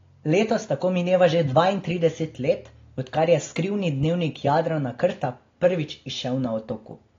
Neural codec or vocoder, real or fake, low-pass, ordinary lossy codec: none; real; 7.2 kHz; AAC, 32 kbps